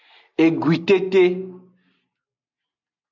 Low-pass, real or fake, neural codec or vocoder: 7.2 kHz; real; none